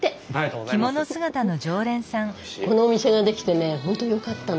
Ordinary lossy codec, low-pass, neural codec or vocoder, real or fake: none; none; none; real